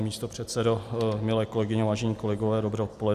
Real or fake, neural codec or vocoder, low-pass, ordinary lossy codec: real; none; 14.4 kHz; Opus, 64 kbps